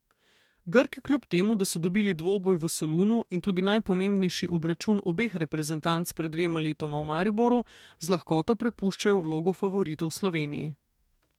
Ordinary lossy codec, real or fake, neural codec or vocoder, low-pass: MP3, 96 kbps; fake; codec, 44.1 kHz, 2.6 kbps, DAC; 19.8 kHz